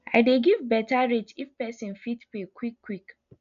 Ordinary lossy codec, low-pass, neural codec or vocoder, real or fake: none; 7.2 kHz; none; real